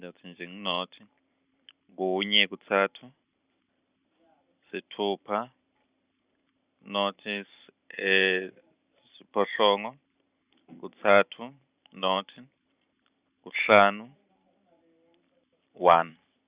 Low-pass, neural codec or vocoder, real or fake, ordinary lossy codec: 3.6 kHz; vocoder, 44.1 kHz, 128 mel bands every 512 samples, BigVGAN v2; fake; Opus, 64 kbps